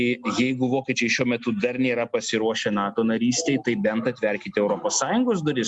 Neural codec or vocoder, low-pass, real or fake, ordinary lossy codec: none; 10.8 kHz; real; Opus, 64 kbps